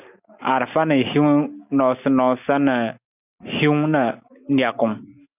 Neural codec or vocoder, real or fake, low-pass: none; real; 3.6 kHz